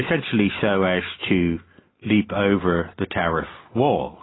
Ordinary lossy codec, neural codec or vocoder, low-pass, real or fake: AAC, 16 kbps; none; 7.2 kHz; real